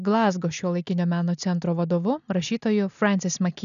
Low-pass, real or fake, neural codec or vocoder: 7.2 kHz; real; none